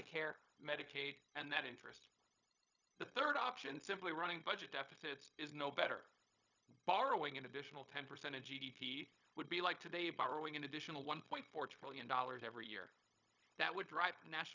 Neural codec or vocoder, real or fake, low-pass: codec, 16 kHz, 0.4 kbps, LongCat-Audio-Codec; fake; 7.2 kHz